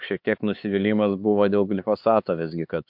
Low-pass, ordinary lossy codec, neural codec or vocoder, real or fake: 5.4 kHz; MP3, 48 kbps; codec, 16 kHz, 4 kbps, X-Codec, HuBERT features, trained on LibriSpeech; fake